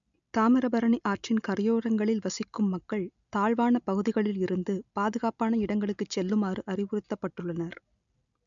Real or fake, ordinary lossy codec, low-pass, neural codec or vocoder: real; none; 7.2 kHz; none